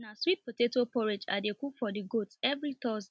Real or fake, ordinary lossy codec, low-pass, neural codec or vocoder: real; none; none; none